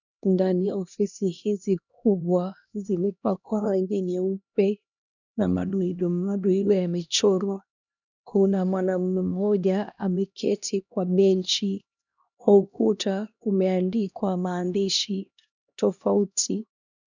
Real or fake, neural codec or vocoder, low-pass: fake; codec, 16 kHz, 1 kbps, X-Codec, HuBERT features, trained on LibriSpeech; 7.2 kHz